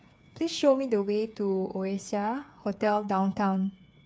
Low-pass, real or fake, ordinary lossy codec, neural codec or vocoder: none; fake; none; codec, 16 kHz, 8 kbps, FreqCodec, smaller model